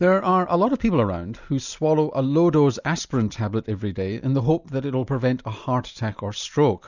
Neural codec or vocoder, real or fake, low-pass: none; real; 7.2 kHz